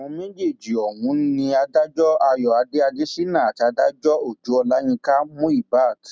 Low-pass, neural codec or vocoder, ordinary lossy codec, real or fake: none; none; none; real